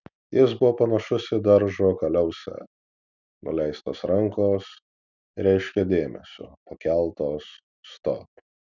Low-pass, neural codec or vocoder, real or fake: 7.2 kHz; none; real